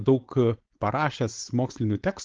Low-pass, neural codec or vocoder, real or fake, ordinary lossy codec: 7.2 kHz; codec, 16 kHz, 4.8 kbps, FACodec; fake; Opus, 16 kbps